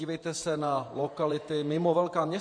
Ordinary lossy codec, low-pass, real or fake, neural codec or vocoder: MP3, 48 kbps; 9.9 kHz; fake; vocoder, 48 kHz, 128 mel bands, Vocos